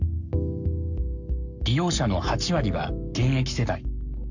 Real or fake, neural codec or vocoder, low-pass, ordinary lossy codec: fake; codec, 44.1 kHz, 7.8 kbps, Pupu-Codec; 7.2 kHz; none